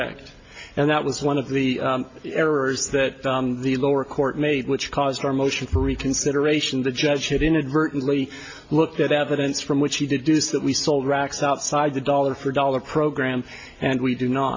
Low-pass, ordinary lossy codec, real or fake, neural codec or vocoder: 7.2 kHz; MP3, 32 kbps; real; none